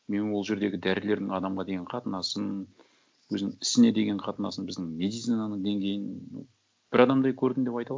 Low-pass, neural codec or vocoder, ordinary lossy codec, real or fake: none; none; none; real